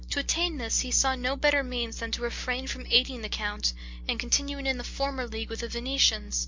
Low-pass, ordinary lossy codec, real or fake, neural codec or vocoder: 7.2 kHz; MP3, 64 kbps; real; none